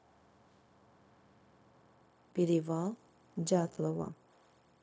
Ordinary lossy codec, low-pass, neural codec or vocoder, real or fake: none; none; codec, 16 kHz, 0.4 kbps, LongCat-Audio-Codec; fake